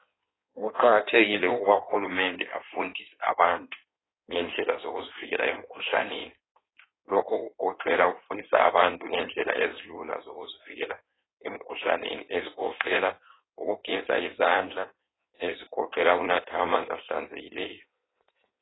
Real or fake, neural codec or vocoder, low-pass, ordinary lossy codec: fake; codec, 16 kHz in and 24 kHz out, 1.1 kbps, FireRedTTS-2 codec; 7.2 kHz; AAC, 16 kbps